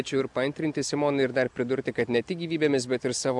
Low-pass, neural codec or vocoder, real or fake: 10.8 kHz; none; real